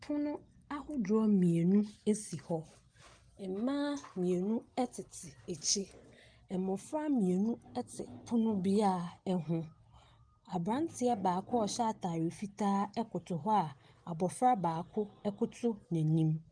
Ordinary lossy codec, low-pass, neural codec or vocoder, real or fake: Opus, 24 kbps; 9.9 kHz; none; real